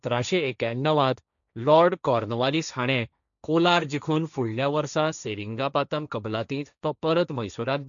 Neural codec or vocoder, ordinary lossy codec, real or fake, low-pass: codec, 16 kHz, 1.1 kbps, Voila-Tokenizer; none; fake; 7.2 kHz